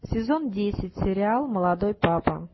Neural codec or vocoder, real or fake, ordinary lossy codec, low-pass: none; real; MP3, 24 kbps; 7.2 kHz